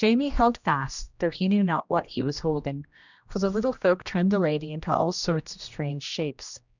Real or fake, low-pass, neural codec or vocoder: fake; 7.2 kHz; codec, 16 kHz, 1 kbps, X-Codec, HuBERT features, trained on general audio